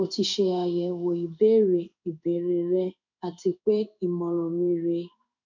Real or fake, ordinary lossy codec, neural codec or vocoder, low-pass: fake; none; codec, 16 kHz in and 24 kHz out, 1 kbps, XY-Tokenizer; 7.2 kHz